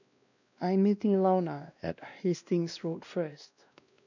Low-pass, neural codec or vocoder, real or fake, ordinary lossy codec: 7.2 kHz; codec, 16 kHz, 1 kbps, X-Codec, WavLM features, trained on Multilingual LibriSpeech; fake; none